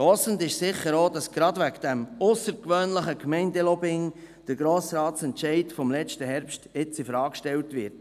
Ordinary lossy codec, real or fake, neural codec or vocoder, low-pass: none; real; none; 14.4 kHz